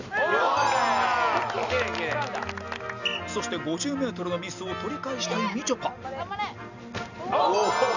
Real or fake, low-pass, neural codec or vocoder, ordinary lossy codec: real; 7.2 kHz; none; none